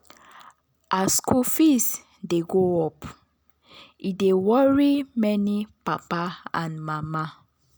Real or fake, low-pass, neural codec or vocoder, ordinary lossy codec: real; none; none; none